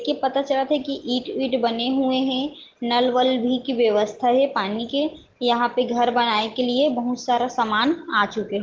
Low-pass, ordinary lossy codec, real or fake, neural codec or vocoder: 7.2 kHz; Opus, 16 kbps; real; none